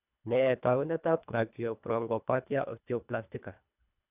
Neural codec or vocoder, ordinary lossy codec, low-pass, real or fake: codec, 24 kHz, 1.5 kbps, HILCodec; none; 3.6 kHz; fake